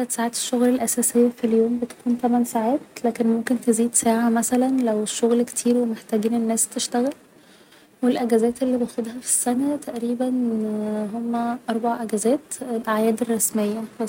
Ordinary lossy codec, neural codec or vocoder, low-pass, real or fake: none; none; 19.8 kHz; real